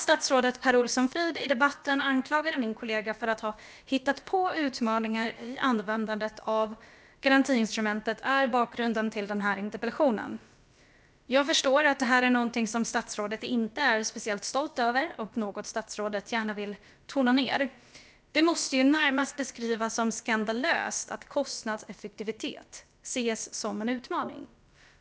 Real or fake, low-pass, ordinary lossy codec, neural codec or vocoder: fake; none; none; codec, 16 kHz, about 1 kbps, DyCAST, with the encoder's durations